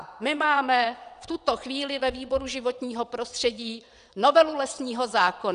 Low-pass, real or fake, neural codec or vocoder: 9.9 kHz; fake; vocoder, 22.05 kHz, 80 mel bands, WaveNeXt